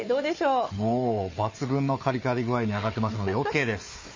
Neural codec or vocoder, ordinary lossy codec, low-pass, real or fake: codec, 16 kHz, 4 kbps, FunCodec, trained on Chinese and English, 50 frames a second; MP3, 32 kbps; 7.2 kHz; fake